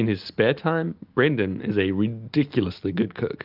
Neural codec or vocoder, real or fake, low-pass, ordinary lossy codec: none; real; 5.4 kHz; Opus, 24 kbps